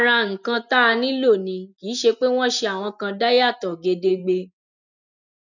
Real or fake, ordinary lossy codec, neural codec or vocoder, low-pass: real; none; none; 7.2 kHz